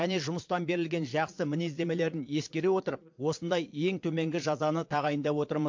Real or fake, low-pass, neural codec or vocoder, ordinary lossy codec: fake; 7.2 kHz; vocoder, 22.05 kHz, 80 mel bands, Vocos; MP3, 48 kbps